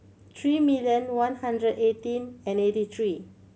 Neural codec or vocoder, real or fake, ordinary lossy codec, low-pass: none; real; none; none